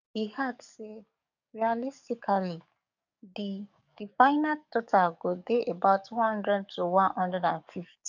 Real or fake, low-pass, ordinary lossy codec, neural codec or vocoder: fake; 7.2 kHz; none; codec, 44.1 kHz, 7.8 kbps, DAC